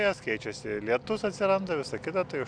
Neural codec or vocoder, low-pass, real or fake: none; 9.9 kHz; real